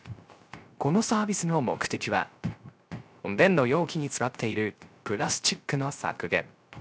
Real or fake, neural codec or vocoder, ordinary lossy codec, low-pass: fake; codec, 16 kHz, 0.3 kbps, FocalCodec; none; none